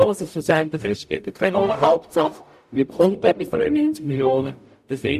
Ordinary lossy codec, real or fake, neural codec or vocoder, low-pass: none; fake; codec, 44.1 kHz, 0.9 kbps, DAC; 14.4 kHz